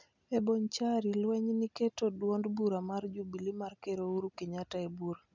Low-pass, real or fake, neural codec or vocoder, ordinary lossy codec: 7.2 kHz; real; none; none